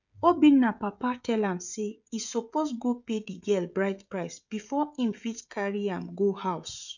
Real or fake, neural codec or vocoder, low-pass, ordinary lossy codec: fake; codec, 16 kHz, 16 kbps, FreqCodec, smaller model; 7.2 kHz; none